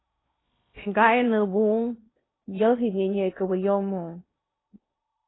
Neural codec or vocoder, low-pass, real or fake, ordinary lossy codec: codec, 16 kHz in and 24 kHz out, 0.8 kbps, FocalCodec, streaming, 65536 codes; 7.2 kHz; fake; AAC, 16 kbps